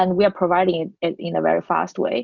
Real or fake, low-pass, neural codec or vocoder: real; 7.2 kHz; none